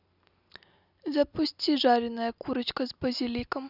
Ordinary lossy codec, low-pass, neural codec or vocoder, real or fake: none; 5.4 kHz; none; real